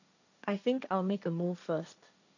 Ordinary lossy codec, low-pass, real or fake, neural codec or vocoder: none; 7.2 kHz; fake; codec, 16 kHz, 1.1 kbps, Voila-Tokenizer